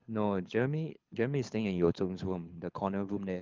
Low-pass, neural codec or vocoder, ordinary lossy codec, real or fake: 7.2 kHz; codec, 24 kHz, 6 kbps, HILCodec; Opus, 32 kbps; fake